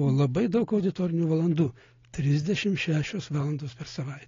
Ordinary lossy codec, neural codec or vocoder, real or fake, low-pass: AAC, 32 kbps; none; real; 7.2 kHz